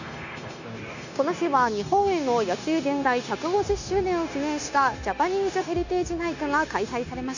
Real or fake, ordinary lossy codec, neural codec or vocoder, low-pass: fake; none; codec, 16 kHz, 0.9 kbps, LongCat-Audio-Codec; 7.2 kHz